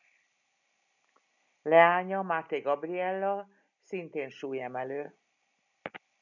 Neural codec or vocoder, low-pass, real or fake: none; 7.2 kHz; real